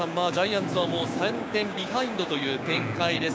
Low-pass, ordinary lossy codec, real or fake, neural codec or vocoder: none; none; fake; codec, 16 kHz, 6 kbps, DAC